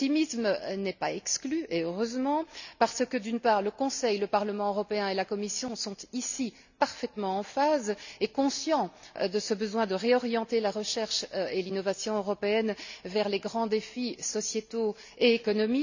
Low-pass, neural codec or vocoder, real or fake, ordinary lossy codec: 7.2 kHz; none; real; none